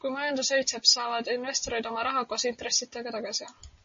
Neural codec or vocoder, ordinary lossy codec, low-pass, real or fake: none; MP3, 32 kbps; 7.2 kHz; real